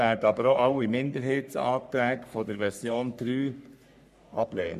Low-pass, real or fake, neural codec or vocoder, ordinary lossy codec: 14.4 kHz; fake; codec, 44.1 kHz, 3.4 kbps, Pupu-Codec; none